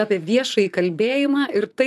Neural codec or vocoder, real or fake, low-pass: vocoder, 44.1 kHz, 128 mel bands, Pupu-Vocoder; fake; 14.4 kHz